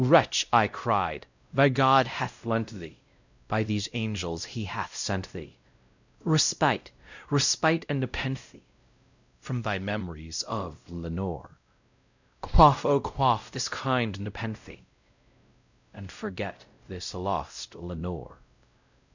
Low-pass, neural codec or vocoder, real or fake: 7.2 kHz; codec, 16 kHz, 0.5 kbps, X-Codec, WavLM features, trained on Multilingual LibriSpeech; fake